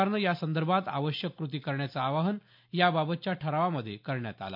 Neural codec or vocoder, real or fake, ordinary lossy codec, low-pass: none; real; MP3, 48 kbps; 5.4 kHz